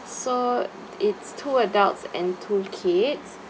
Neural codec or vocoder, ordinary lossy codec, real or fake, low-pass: none; none; real; none